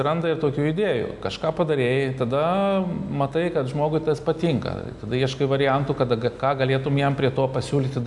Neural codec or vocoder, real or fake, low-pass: none; real; 10.8 kHz